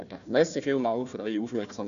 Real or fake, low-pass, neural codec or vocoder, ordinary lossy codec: fake; 7.2 kHz; codec, 16 kHz, 1 kbps, FunCodec, trained on Chinese and English, 50 frames a second; none